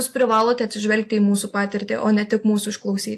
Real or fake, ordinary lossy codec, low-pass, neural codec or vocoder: real; AAC, 64 kbps; 14.4 kHz; none